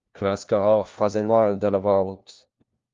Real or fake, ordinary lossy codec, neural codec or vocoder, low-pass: fake; Opus, 32 kbps; codec, 16 kHz, 1 kbps, FunCodec, trained on LibriTTS, 50 frames a second; 7.2 kHz